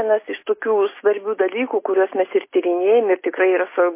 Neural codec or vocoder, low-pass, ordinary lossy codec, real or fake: none; 3.6 kHz; MP3, 24 kbps; real